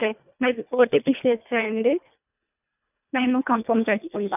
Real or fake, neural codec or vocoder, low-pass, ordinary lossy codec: fake; codec, 24 kHz, 1.5 kbps, HILCodec; 3.6 kHz; none